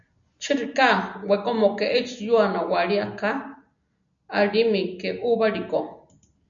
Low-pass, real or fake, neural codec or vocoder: 7.2 kHz; real; none